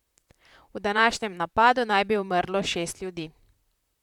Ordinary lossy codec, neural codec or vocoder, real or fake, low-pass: none; vocoder, 44.1 kHz, 128 mel bands, Pupu-Vocoder; fake; 19.8 kHz